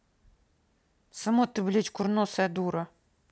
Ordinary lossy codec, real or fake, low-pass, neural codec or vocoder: none; real; none; none